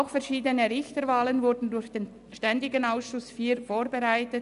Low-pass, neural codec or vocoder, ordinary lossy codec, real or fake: 10.8 kHz; none; none; real